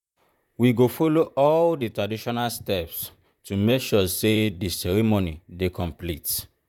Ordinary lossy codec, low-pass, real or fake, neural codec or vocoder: none; 19.8 kHz; fake; vocoder, 44.1 kHz, 128 mel bands, Pupu-Vocoder